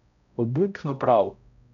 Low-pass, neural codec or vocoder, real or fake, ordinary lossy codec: 7.2 kHz; codec, 16 kHz, 0.5 kbps, X-Codec, HuBERT features, trained on balanced general audio; fake; MP3, 96 kbps